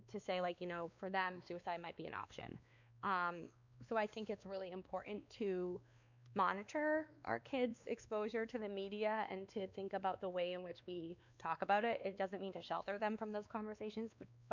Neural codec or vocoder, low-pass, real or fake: codec, 16 kHz, 2 kbps, X-Codec, WavLM features, trained on Multilingual LibriSpeech; 7.2 kHz; fake